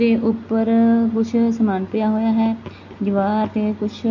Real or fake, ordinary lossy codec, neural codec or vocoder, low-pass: real; MP3, 48 kbps; none; 7.2 kHz